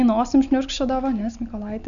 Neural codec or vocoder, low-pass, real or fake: none; 7.2 kHz; real